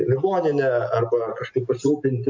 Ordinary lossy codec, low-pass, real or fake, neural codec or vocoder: MP3, 48 kbps; 7.2 kHz; real; none